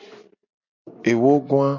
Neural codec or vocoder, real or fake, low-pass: none; real; 7.2 kHz